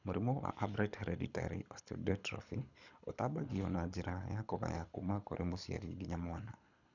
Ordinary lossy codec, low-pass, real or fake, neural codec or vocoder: none; 7.2 kHz; fake; codec, 24 kHz, 6 kbps, HILCodec